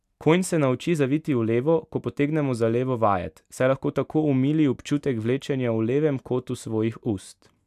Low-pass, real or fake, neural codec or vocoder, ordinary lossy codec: 14.4 kHz; real; none; none